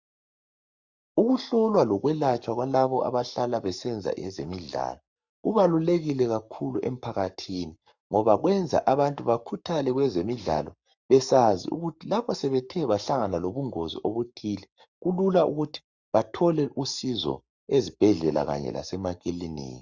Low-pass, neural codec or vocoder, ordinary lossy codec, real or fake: 7.2 kHz; codec, 44.1 kHz, 7.8 kbps, Pupu-Codec; Opus, 64 kbps; fake